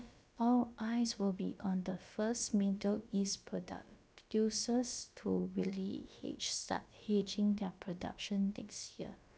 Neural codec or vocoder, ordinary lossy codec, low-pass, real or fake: codec, 16 kHz, about 1 kbps, DyCAST, with the encoder's durations; none; none; fake